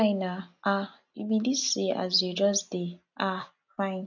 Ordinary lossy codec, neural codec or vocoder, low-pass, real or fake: none; none; 7.2 kHz; real